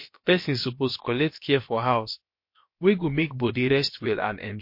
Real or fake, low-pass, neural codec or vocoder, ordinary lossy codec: fake; 5.4 kHz; codec, 16 kHz, about 1 kbps, DyCAST, with the encoder's durations; MP3, 32 kbps